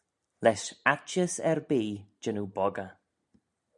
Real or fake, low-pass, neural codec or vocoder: real; 10.8 kHz; none